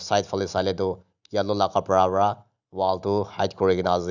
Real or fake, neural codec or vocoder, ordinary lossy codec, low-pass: real; none; none; 7.2 kHz